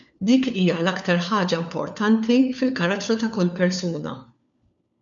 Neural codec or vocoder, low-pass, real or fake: codec, 16 kHz, 4 kbps, FunCodec, trained on LibriTTS, 50 frames a second; 7.2 kHz; fake